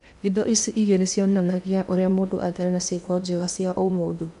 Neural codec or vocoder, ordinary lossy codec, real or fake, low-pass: codec, 16 kHz in and 24 kHz out, 0.8 kbps, FocalCodec, streaming, 65536 codes; none; fake; 10.8 kHz